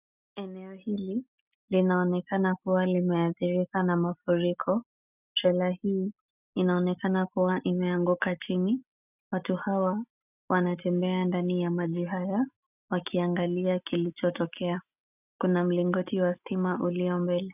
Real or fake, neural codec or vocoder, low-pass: real; none; 3.6 kHz